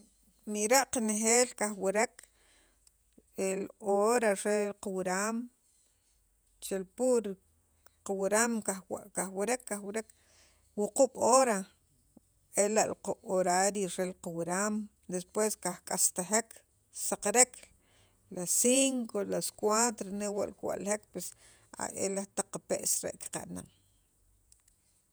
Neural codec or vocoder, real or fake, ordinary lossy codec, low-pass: vocoder, 48 kHz, 128 mel bands, Vocos; fake; none; none